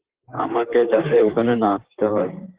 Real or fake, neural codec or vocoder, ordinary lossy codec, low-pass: fake; vocoder, 44.1 kHz, 128 mel bands, Pupu-Vocoder; Opus, 16 kbps; 3.6 kHz